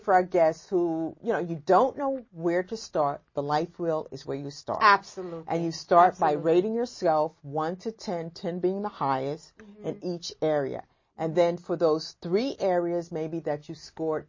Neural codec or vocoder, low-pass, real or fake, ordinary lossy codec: none; 7.2 kHz; real; MP3, 32 kbps